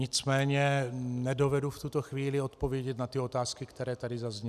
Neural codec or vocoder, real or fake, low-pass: none; real; 14.4 kHz